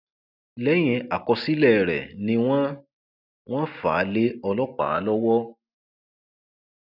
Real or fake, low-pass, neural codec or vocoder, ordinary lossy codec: real; 5.4 kHz; none; none